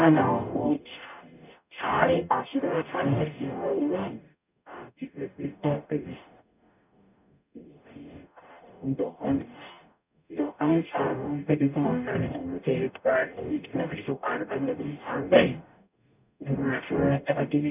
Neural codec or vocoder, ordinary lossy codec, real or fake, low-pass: codec, 44.1 kHz, 0.9 kbps, DAC; none; fake; 3.6 kHz